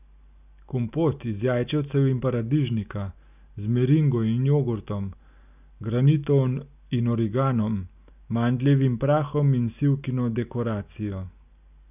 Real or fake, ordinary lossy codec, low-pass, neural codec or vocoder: real; none; 3.6 kHz; none